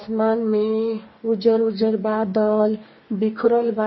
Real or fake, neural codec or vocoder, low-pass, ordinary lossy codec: fake; codec, 44.1 kHz, 2.6 kbps, DAC; 7.2 kHz; MP3, 24 kbps